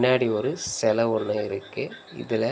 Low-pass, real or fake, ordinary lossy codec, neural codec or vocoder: none; real; none; none